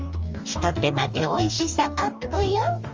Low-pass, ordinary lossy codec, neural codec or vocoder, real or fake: 7.2 kHz; Opus, 32 kbps; codec, 44.1 kHz, 2.6 kbps, DAC; fake